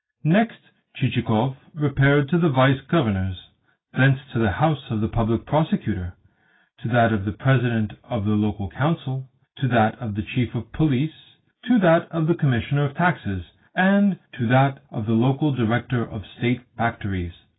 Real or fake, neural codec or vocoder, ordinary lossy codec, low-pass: real; none; AAC, 16 kbps; 7.2 kHz